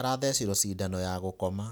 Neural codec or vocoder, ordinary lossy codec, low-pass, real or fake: none; none; none; real